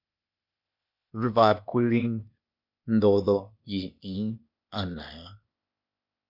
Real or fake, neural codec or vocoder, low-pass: fake; codec, 16 kHz, 0.8 kbps, ZipCodec; 5.4 kHz